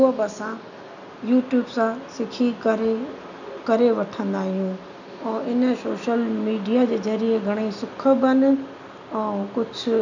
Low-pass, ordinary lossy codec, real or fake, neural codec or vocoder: 7.2 kHz; none; real; none